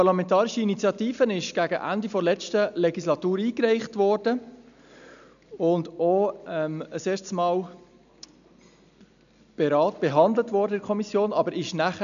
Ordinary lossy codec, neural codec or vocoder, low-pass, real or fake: none; none; 7.2 kHz; real